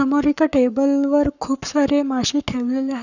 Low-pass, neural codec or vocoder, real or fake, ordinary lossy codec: 7.2 kHz; codec, 44.1 kHz, 7.8 kbps, Pupu-Codec; fake; none